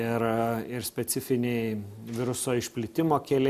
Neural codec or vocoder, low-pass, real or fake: none; 14.4 kHz; real